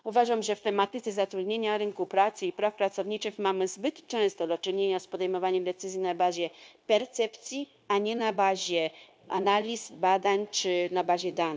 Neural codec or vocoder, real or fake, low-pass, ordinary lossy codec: codec, 16 kHz, 0.9 kbps, LongCat-Audio-Codec; fake; none; none